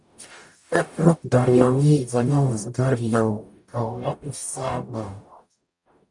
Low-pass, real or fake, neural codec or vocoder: 10.8 kHz; fake; codec, 44.1 kHz, 0.9 kbps, DAC